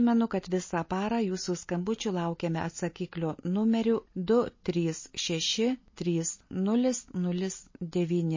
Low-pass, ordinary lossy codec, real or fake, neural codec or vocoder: 7.2 kHz; MP3, 32 kbps; real; none